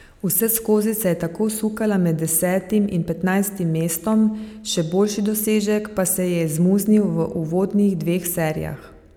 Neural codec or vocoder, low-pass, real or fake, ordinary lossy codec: none; 19.8 kHz; real; none